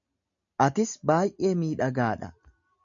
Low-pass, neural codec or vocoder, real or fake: 7.2 kHz; none; real